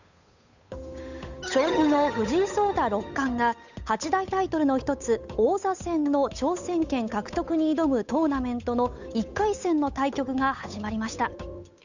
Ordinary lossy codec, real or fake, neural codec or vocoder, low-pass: none; fake; codec, 16 kHz, 8 kbps, FunCodec, trained on Chinese and English, 25 frames a second; 7.2 kHz